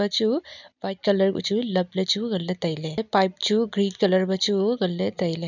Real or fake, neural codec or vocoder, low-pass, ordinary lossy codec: real; none; 7.2 kHz; none